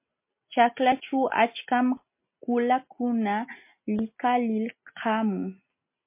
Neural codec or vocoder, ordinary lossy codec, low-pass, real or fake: none; MP3, 24 kbps; 3.6 kHz; real